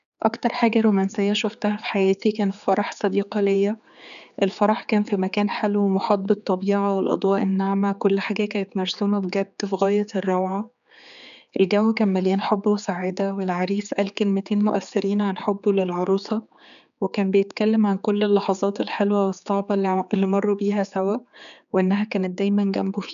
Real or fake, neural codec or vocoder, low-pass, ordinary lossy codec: fake; codec, 16 kHz, 4 kbps, X-Codec, HuBERT features, trained on general audio; 7.2 kHz; none